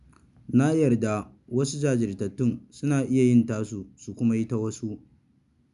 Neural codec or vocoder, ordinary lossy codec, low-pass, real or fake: none; none; 10.8 kHz; real